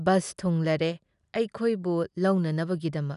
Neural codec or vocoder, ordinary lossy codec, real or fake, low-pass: none; none; real; 10.8 kHz